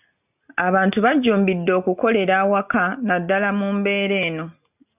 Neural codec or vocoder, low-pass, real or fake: none; 3.6 kHz; real